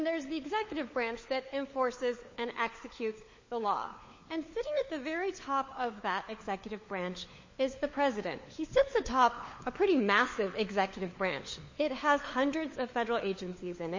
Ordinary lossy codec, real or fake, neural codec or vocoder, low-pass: MP3, 32 kbps; fake; codec, 16 kHz, 4 kbps, FunCodec, trained on LibriTTS, 50 frames a second; 7.2 kHz